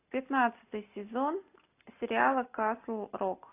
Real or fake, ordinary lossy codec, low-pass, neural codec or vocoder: real; AAC, 24 kbps; 3.6 kHz; none